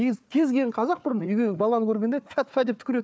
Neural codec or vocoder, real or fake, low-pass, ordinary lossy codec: codec, 16 kHz, 4 kbps, FunCodec, trained on Chinese and English, 50 frames a second; fake; none; none